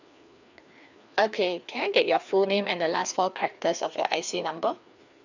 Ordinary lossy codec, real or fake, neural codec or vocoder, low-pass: none; fake; codec, 16 kHz, 2 kbps, FreqCodec, larger model; 7.2 kHz